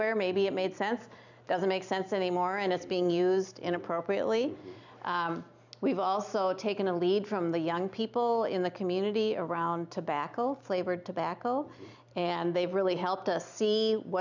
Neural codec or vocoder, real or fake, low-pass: vocoder, 44.1 kHz, 128 mel bands every 256 samples, BigVGAN v2; fake; 7.2 kHz